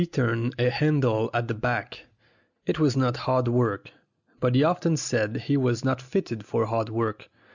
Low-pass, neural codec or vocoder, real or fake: 7.2 kHz; none; real